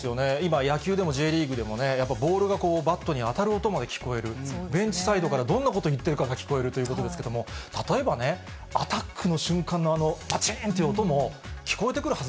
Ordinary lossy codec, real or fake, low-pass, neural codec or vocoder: none; real; none; none